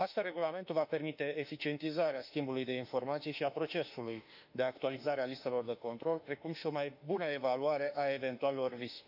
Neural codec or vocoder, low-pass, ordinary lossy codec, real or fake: autoencoder, 48 kHz, 32 numbers a frame, DAC-VAE, trained on Japanese speech; 5.4 kHz; none; fake